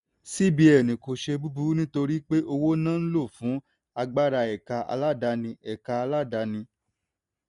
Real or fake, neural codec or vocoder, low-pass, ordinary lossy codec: real; none; 10.8 kHz; none